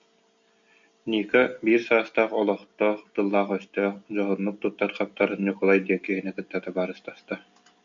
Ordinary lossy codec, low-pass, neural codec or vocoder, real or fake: MP3, 64 kbps; 7.2 kHz; none; real